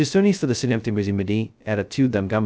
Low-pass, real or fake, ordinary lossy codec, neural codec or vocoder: none; fake; none; codec, 16 kHz, 0.2 kbps, FocalCodec